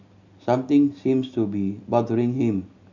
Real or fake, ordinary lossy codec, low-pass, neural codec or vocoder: real; none; 7.2 kHz; none